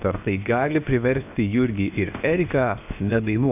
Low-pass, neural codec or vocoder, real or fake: 3.6 kHz; codec, 16 kHz, 0.7 kbps, FocalCodec; fake